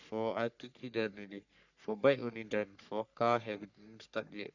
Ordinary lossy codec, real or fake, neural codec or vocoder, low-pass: none; fake; codec, 44.1 kHz, 3.4 kbps, Pupu-Codec; 7.2 kHz